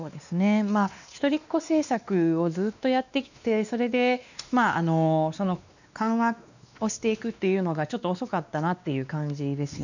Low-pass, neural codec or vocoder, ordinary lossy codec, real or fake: 7.2 kHz; codec, 16 kHz, 2 kbps, X-Codec, WavLM features, trained on Multilingual LibriSpeech; none; fake